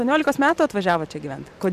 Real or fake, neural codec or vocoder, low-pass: fake; vocoder, 44.1 kHz, 128 mel bands every 512 samples, BigVGAN v2; 14.4 kHz